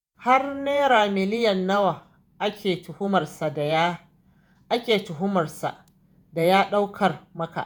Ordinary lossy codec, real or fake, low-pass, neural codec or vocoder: none; fake; none; vocoder, 48 kHz, 128 mel bands, Vocos